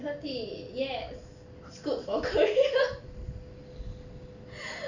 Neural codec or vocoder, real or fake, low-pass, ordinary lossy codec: none; real; 7.2 kHz; none